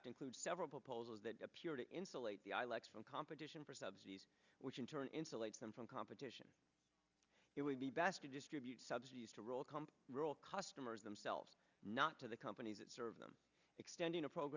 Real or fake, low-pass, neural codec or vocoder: real; 7.2 kHz; none